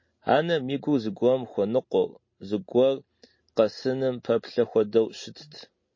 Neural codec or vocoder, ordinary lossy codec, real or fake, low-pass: none; MP3, 32 kbps; real; 7.2 kHz